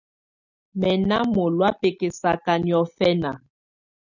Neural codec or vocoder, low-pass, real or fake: none; 7.2 kHz; real